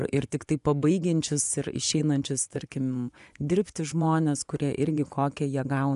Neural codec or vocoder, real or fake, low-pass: vocoder, 24 kHz, 100 mel bands, Vocos; fake; 10.8 kHz